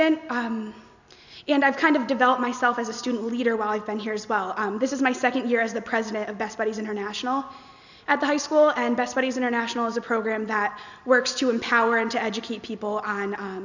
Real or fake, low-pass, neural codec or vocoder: real; 7.2 kHz; none